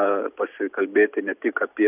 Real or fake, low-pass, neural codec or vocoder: real; 3.6 kHz; none